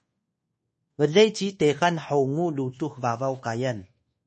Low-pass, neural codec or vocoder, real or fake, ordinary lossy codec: 10.8 kHz; codec, 24 kHz, 1.2 kbps, DualCodec; fake; MP3, 32 kbps